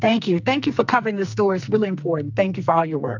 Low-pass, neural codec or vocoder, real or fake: 7.2 kHz; codec, 44.1 kHz, 2.6 kbps, SNAC; fake